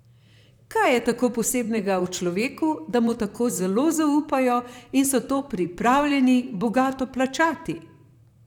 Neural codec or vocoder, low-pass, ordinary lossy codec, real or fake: vocoder, 44.1 kHz, 128 mel bands, Pupu-Vocoder; 19.8 kHz; none; fake